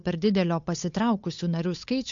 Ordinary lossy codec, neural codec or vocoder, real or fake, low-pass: AAC, 64 kbps; codec, 16 kHz, 8 kbps, FunCodec, trained on Chinese and English, 25 frames a second; fake; 7.2 kHz